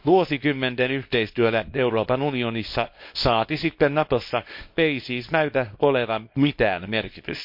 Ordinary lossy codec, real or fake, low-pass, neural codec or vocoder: MP3, 32 kbps; fake; 5.4 kHz; codec, 24 kHz, 0.9 kbps, WavTokenizer, small release